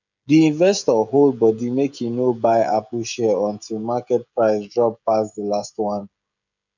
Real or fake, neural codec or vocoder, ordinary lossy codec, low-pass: fake; codec, 16 kHz, 16 kbps, FreqCodec, smaller model; none; 7.2 kHz